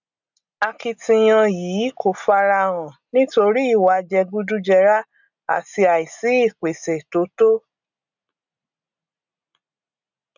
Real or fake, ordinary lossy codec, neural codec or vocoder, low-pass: real; none; none; 7.2 kHz